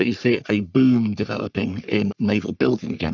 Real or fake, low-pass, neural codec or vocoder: fake; 7.2 kHz; codec, 44.1 kHz, 3.4 kbps, Pupu-Codec